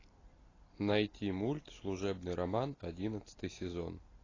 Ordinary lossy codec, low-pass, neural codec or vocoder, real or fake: AAC, 32 kbps; 7.2 kHz; none; real